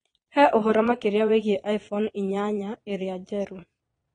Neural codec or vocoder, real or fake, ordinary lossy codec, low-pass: vocoder, 22.05 kHz, 80 mel bands, Vocos; fake; AAC, 32 kbps; 9.9 kHz